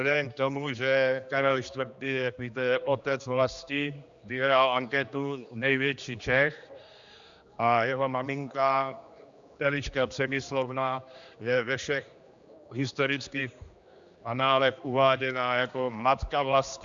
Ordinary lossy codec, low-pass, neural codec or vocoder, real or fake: Opus, 64 kbps; 7.2 kHz; codec, 16 kHz, 2 kbps, X-Codec, HuBERT features, trained on general audio; fake